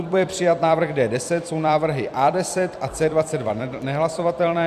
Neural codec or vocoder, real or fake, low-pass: vocoder, 44.1 kHz, 128 mel bands every 256 samples, BigVGAN v2; fake; 14.4 kHz